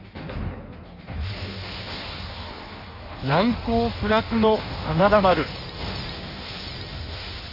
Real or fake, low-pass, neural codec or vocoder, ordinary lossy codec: fake; 5.4 kHz; codec, 16 kHz in and 24 kHz out, 1.1 kbps, FireRedTTS-2 codec; none